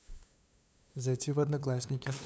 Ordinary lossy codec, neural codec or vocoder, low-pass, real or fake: none; codec, 16 kHz, 2 kbps, FunCodec, trained on LibriTTS, 25 frames a second; none; fake